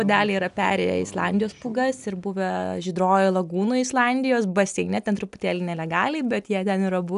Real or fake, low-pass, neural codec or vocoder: real; 10.8 kHz; none